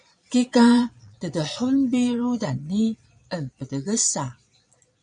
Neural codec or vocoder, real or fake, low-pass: vocoder, 22.05 kHz, 80 mel bands, Vocos; fake; 9.9 kHz